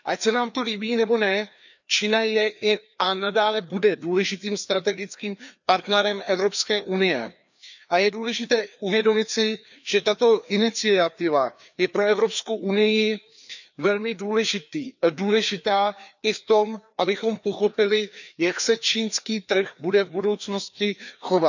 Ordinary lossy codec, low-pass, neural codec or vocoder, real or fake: none; 7.2 kHz; codec, 16 kHz, 2 kbps, FreqCodec, larger model; fake